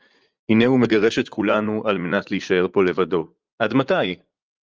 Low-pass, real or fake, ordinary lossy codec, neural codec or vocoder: 7.2 kHz; fake; Opus, 24 kbps; vocoder, 44.1 kHz, 80 mel bands, Vocos